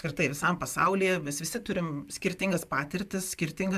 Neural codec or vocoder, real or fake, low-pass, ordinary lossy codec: none; real; 14.4 kHz; MP3, 96 kbps